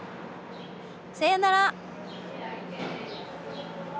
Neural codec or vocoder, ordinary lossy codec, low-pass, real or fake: none; none; none; real